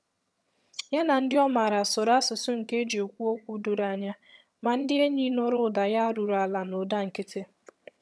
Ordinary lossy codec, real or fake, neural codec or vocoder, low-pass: none; fake; vocoder, 22.05 kHz, 80 mel bands, HiFi-GAN; none